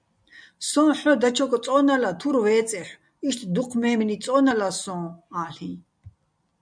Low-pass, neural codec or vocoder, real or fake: 9.9 kHz; none; real